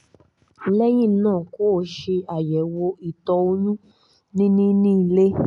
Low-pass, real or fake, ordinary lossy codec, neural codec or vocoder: 10.8 kHz; real; none; none